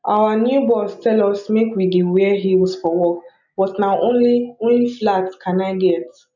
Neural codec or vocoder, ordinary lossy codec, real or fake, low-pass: none; none; real; 7.2 kHz